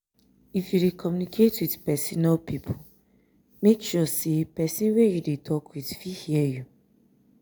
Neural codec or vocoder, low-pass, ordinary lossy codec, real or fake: none; none; none; real